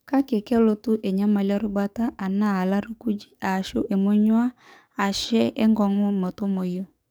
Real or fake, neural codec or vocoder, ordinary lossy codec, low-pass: fake; codec, 44.1 kHz, 7.8 kbps, DAC; none; none